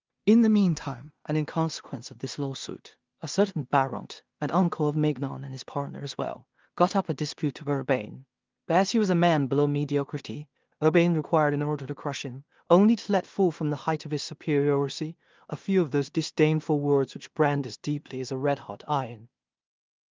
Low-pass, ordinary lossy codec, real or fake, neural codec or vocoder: 7.2 kHz; Opus, 32 kbps; fake; codec, 16 kHz in and 24 kHz out, 0.4 kbps, LongCat-Audio-Codec, two codebook decoder